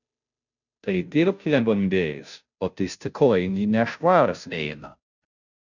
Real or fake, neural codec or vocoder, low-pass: fake; codec, 16 kHz, 0.5 kbps, FunCodec, trained on Chinese and English, 25 frames a second; 7.2 kHz